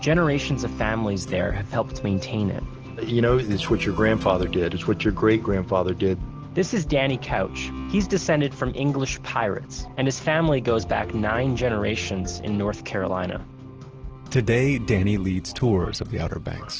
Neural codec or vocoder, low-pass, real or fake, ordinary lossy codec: none; 7.2 kHz; real; Opus, 16 kbps